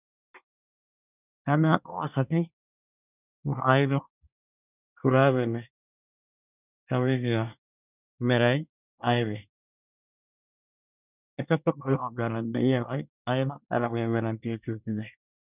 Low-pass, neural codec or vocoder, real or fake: 3.6 kHz; codec, 24 kHz, 1 kbps, SNAC; fake